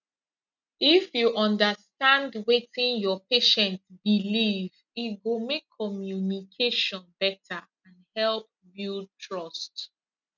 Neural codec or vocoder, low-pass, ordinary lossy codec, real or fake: none; 7.2 kHz; none; real